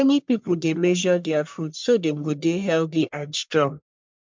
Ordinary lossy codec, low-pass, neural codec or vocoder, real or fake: MP3, 64 kbps; 7.2 kHz; codec, 44.1 kHz, 1.7 kbps, Pupu-Codec; fake